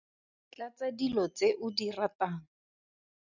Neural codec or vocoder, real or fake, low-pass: none; real; 7.2 kHz